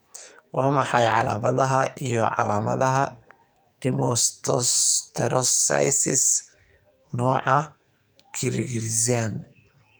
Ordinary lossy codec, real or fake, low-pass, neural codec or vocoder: none; fake; none; codec, 44.1 kHz, 2.6 kbps, SNAC